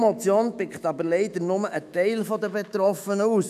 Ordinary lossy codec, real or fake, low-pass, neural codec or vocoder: none; fake; 14.4 kHz; autoencoder, 48 kHz, 128 numbers a frame, DAC-VAE, trained on Japanese speech